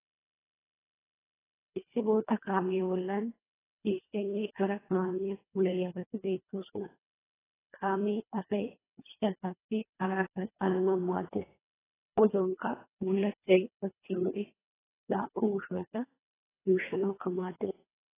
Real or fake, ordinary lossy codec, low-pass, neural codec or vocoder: fake; AAC, 16 kbps; 3.6 kHz; codec, 24 kHz, 1.5 kbps, HILCodec